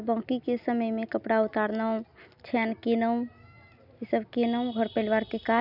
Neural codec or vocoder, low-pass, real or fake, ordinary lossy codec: none; 5.4 kHz; real; none